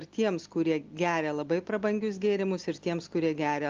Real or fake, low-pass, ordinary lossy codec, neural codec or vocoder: real; 7.2 kHz; Opus, 24 kbps; none